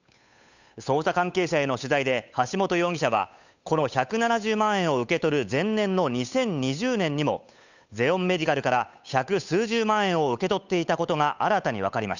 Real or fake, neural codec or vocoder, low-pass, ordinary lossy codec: fake; codec, 16 kHz, 8 kbps, FunCodec, trained on Chinese and English, 25 frames a second; 7.2 kHz; none